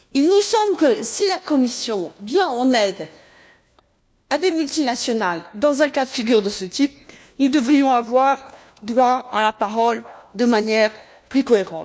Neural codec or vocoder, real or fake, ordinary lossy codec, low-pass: codec, 16 kHz, 1 kbps, FunCodec, trained on LibriTTS, 50 frames a second; fake; none; none